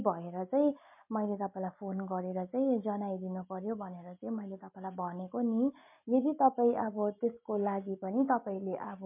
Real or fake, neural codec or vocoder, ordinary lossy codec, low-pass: real; none; MP3, 24 kbps; 3.6 kHz